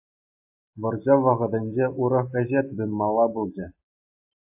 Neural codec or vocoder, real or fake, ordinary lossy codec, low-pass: none; real; AAC, 32 kbps; 3.6 kHz